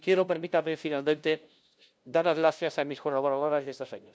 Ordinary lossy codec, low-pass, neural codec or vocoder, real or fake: none; none; codec, 16 kHz, 0.5 kbps, FunCodec, trained on LibriTTS, 25 frames a second; fake